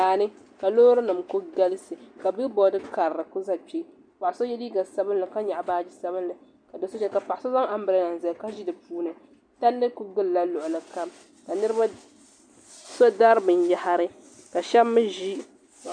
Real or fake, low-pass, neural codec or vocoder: real; 9.9 kHz; none